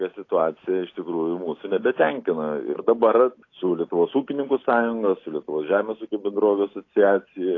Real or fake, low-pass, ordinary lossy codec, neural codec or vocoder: real; 7.2 kHz; AAC, 32 kbps; none